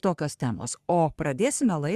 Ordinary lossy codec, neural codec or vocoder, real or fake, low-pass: Opus, 64 kbps; codec, 44.1 kHz, 3.4 kbps, Pupu-Codec; fake; 14.4 kHz